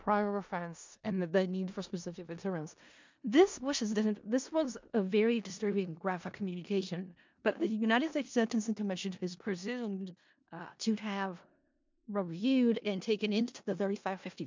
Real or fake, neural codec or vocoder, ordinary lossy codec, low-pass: fake; codec, 16 kHz in and 24 kHz out, 0.4 kbps, LongCat-Audio-Codec, four codebook decoder; MP3, 64 kbps; 7.2 kHz